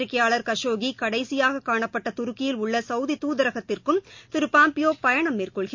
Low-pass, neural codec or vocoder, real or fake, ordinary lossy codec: 7.2 kHz; none; real; MP3, 48 kbps